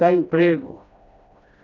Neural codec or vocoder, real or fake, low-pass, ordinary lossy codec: codec, 16 kHz, 1 kbps, FreqCodec, smaller model; fake; 7.2 kHz; none